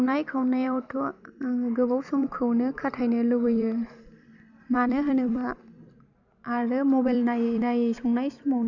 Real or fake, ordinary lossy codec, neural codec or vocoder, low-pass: fake; none; vocoder, 44.1 kHz, 128 mel bands every 256 samples, BigVGAN v2; 7.2 kHz